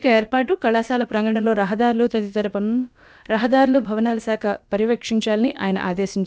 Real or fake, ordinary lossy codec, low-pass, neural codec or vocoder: fake; none; none; codec, 16 kHz, about 1 kbps, DyCAST, with the encoder's durations